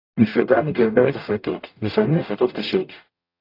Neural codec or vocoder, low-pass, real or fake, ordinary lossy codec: codec, 44.1 kHz, 0.9 kbps, DAC; 5.4 kHz; fake; AAC, 32 kbps